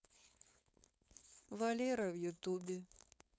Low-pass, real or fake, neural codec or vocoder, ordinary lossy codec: none; fake; codec, 16 kHz, 4.8 kbps, FACodec; none